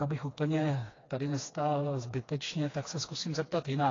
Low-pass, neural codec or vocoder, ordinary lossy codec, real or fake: 7.2 kHz; codec, 16 kHz, 2 kbps, FreqCodec, smaller model; AAC, 32 kbps; fake